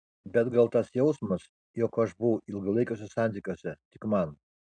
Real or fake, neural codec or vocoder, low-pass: real; none; 9.9 kHz